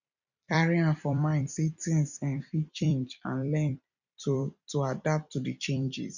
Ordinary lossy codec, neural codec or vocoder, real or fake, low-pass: none; vocoder, 44.1 kHz, 128 mel bands every 256 samples, BigVGAN v2; fake; 7.2 kHz